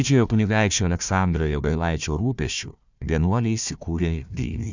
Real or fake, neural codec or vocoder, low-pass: fake; codec, 16 kHz, 1 kbps, FunCodec, trained on Chinese and English, 50 frames a second; 7.2 kHz